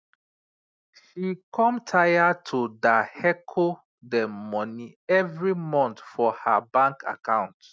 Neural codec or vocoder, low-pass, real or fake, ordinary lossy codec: none; none; real; none